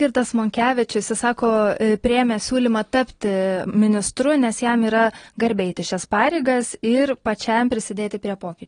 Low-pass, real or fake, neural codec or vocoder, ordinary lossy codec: 9.9 kHz; real; none; AAC, 32 kbps